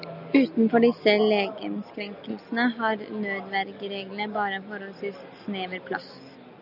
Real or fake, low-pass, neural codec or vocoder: real; 5.4 kHz; none